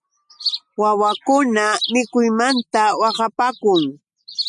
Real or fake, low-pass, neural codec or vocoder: real; 10.8 kHz; none